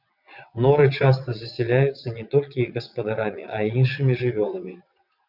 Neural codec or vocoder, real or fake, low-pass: vocoder, 22.05 kHz, 80 mel bands, WaveNeXt; fake; 5.4 kHz